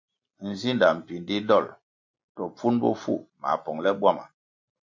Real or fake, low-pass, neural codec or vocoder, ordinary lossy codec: real; 7.2 kHz; none; MP3, 48 kbps